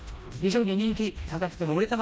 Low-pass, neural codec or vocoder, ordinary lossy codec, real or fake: none; codec, 16 kHz, 1 kbps, FreqCodec, smaller model; none; fake